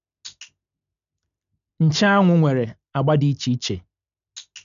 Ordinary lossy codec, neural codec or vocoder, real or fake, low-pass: none; none; real; 7.2 kHz